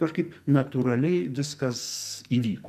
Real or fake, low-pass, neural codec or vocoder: fake; 14.4 kHz; codec, 44.1 kHz, 2.6 kbps, SNAC